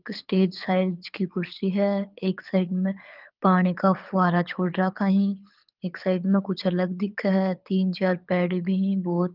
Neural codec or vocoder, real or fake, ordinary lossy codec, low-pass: codec, 24 kHz, 6 kbps, HILCodec; fake; Opus, 24 kbps; 5.4 kHz